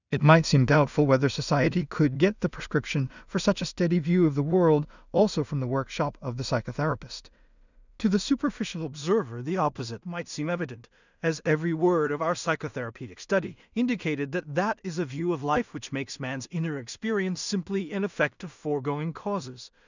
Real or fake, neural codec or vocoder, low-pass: fake; codec, 16 kHz in and 24 kHz out, 0.4 kbps, LongCat-Audio-Codec, two codebook decoder; 7.2 kHz